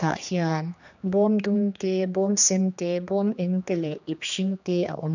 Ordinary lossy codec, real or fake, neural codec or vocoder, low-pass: none; fake; codec, 16 kHz, 2 kbps, X-Codec, HuBERT features, trained on general audio; 7.2 kHz